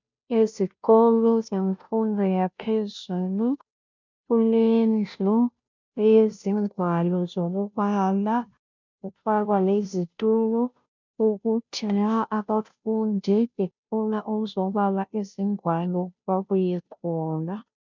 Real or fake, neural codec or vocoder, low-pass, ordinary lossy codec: fake; codec, 16 kHz, 0.5 kbps, FunCodec, trained on Chinese and English, 25 frames a second; 7.2 kHz; MP3, 64 kbps